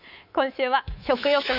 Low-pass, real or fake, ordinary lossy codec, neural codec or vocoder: 5.4 kHz; fake; none; codec, 24 kHz, 3.1 kbps, DualCodec